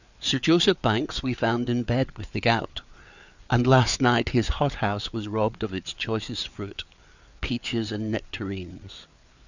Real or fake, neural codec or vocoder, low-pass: fake; codec, 16 kHz, 4 kbps, FreqCodec, larger model; 7.2 kHz